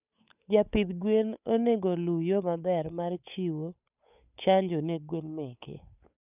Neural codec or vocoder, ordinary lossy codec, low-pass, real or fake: codec, 16 kHz, 8 kbps, FunCodec, trained on Chinese and English, 25 frames a second; none; 3.6 kHz; fake